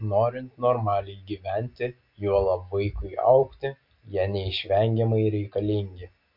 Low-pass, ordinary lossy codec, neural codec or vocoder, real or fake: 5.4 kHz; MP3, 48 kbps; none; real